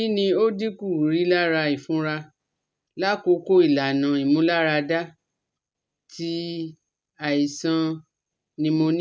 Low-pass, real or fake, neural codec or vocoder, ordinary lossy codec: none; real; none; none